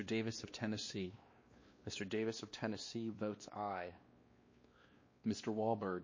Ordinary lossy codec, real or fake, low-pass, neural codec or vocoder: MP3, 32 kbps; fake; 7.2 kHz; codec, 16 kHz, 2 kbps, X-Codec, WavLM features, trained on Multilingual LibriSpeech